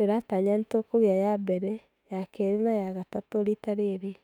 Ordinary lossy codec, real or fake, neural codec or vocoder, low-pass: none; fake; autoencoder, 48 kHz, 32 numbers a frame, DAC-VAE, trained on Japanese speech; 19.8 kHz